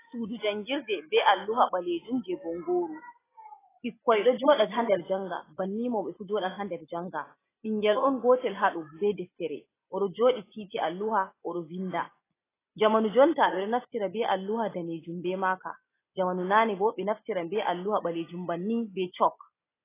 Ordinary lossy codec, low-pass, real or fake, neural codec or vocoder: AAC, 16 kbps; 3.6 kHz; real; none